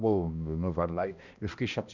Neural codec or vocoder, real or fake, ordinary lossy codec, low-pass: codec, 16 kHz, 1 kbps, X-Codec, HuBERT features, trained on balanced general audio; fake; none; 7.2 kHz